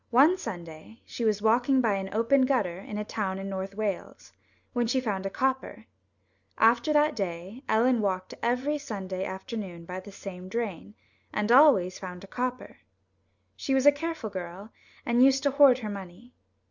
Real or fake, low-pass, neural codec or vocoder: real; 7.2 kHz; none